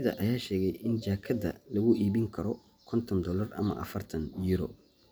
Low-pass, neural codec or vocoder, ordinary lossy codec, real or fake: none; none; none; real